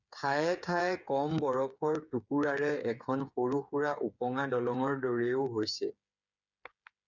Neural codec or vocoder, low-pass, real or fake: codec, 16 kHz, 8 kbps, FreqCodec, smaller model; 7.2 kHz; fake